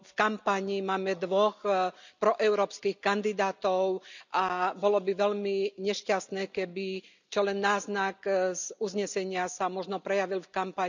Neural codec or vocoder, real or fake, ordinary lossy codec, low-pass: none; real; none; 7.2 kHz